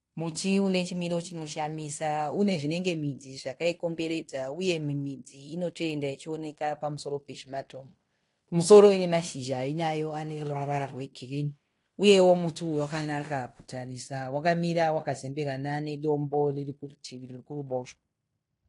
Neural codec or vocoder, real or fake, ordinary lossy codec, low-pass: codec, 16 kHz in and 24 kHz out, 0.9 kbps, LongCat-Audio-Codec, fine tuned four codebook decoder; fake; AAC, 48 kbps; 10.8 kHz